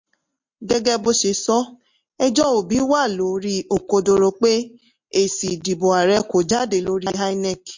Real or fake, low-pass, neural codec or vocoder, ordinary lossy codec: real; 7.2 kHz; none; MP3, 48 kbps